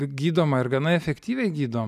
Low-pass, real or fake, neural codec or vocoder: 14.4 kHz; real; none